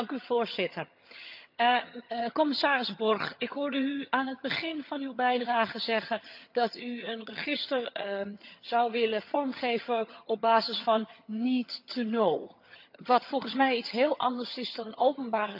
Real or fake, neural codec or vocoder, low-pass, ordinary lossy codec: fake; vocoder, 22.05 kHz, 80 mel bands, HiFi-GAN; 5.4 kHz; none